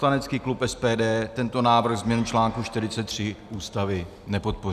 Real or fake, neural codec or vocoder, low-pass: real; none; 14.4 kHz